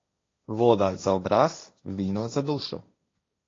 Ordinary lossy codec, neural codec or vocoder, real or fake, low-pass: AAC, 32 kbps; codec, 16 kHz, 1.1 kbps, Voila-Tokenizer; fake; 7.2 kHz